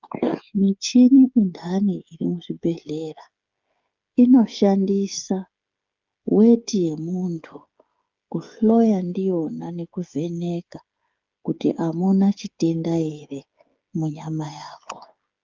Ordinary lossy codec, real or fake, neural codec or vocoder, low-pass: Opus, 24 kbps; fake; codec, 16 kHz, 16 kbps, FreqCodec, smaller model; 7.2 kHz